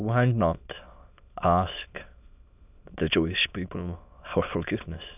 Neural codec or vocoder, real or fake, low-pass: autoencoder, 22.05 kHz, a latent of 192 numbers a frame, VITS, trained on many speakers; fake; 3.6 kHz